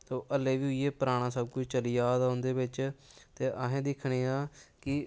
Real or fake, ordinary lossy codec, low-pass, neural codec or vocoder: real; none; none; none